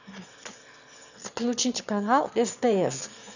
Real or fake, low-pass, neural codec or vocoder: fake; 7.2 kHz; autoencoder, 22.05 kHz, a latent of 192 numbers a frame, VITS, trained on one speaker